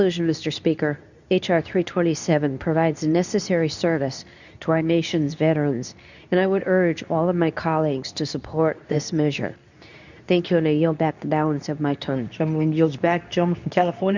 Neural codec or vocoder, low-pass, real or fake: codec, 24 kHz, 0.9 kbps, WavTokenizer, medium speech release version 2; 7.2 kHz; fake